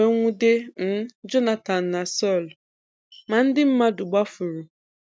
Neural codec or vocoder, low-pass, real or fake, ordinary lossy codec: none; none; real; none